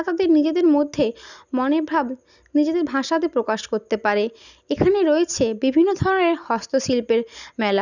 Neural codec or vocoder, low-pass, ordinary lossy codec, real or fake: none; 7.2 kHz; none; real